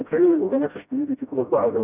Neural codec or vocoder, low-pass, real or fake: codec, 16 kHz, 0.5 kbps, FreqCodec, smaller model; 3.6 kHz; fake